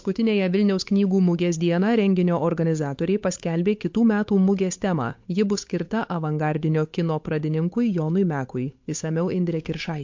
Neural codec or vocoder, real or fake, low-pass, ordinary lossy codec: codec, 16 kHz, 8 kbps, FunCodec, trained on Chinese and English, 25 frames a second; fake; 7.2 kHz; MP3, 48 kbps